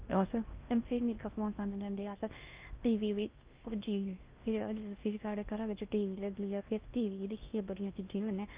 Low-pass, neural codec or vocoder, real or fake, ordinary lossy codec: 3.6 kHz; codec, 16 kHz in and 24 kHz out, 0.6 kbps, FocalCodec, streaming, 2048 codes; fake; Opus, 24 kbps